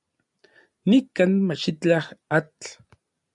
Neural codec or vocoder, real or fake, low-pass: none; real; 10.8 kHz